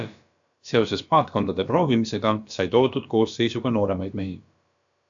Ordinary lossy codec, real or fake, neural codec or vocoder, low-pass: AAC, 64 kbps; fake; codec, 16 kHz, about 1 kbps, DyCAST, with the encoder's durations; 7.2 kHz